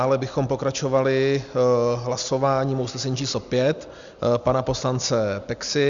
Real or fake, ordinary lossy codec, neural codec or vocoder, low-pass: real; Opus, 64 kbps; none; 7.2 kHz